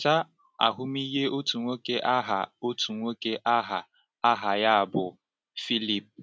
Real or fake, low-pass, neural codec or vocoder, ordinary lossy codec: real; none; none; none